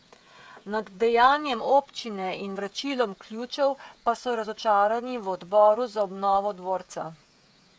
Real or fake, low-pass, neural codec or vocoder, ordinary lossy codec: fake; none; codec, 16 kHz, 16 kbps, FreqCodec, smaller model; none